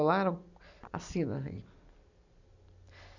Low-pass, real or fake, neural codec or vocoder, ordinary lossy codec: 7.2 kHz; real; none; none